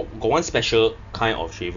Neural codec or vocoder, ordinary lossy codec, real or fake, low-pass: none; none; real; 7.2 kHz